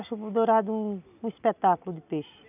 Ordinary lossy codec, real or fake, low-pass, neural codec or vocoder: none; real; 3.6 kHz; none